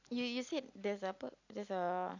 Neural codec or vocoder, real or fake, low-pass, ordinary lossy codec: none; real; 7.2 kHz; none